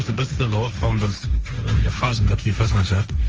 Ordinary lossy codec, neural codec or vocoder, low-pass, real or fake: Opus, 24 kbps; codec, 16 kHz, 1.1 kbps, Voila-Tokenizer; 7.2 kHz; fake